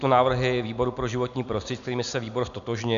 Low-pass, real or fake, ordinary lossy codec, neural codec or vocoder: 7.2 kHz; real; MP3, 96 kbps; none